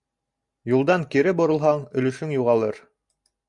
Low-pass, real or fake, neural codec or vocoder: 10.8 kHz; real; none